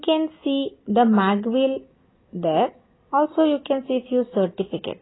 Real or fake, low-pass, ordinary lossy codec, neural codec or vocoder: real; 7.2 kHz; AAC, 16 kbps; none